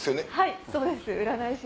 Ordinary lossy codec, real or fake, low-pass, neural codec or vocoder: none; real; none; none